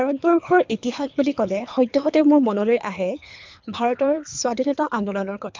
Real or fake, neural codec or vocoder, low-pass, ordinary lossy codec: fake; codec, 24 kHz, 3 kbps, HILCodec; 7.2 kHz; MP3, 64 kbps